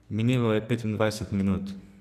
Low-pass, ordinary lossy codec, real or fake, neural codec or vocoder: 14.4 kHz; none; fake; codec, 44.1 kHz, 2.6 kbps, SNAC